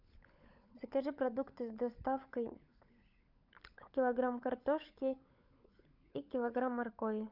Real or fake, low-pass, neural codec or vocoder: fake; 5.4 kHz; codec, 16 kHz, 16 kbps, FunCodec, trained on LibriTTS, 50 frames a second